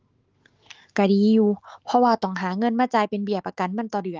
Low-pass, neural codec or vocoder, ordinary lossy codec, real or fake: 7.2 kHz; autoencoder, 48 kHz, 128 numbers a frame, DAC-VAE, trained on Japanese speech; Opus, 16 kbps; fake